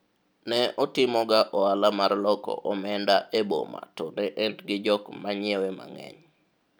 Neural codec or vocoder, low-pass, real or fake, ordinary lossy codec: none; none; real; none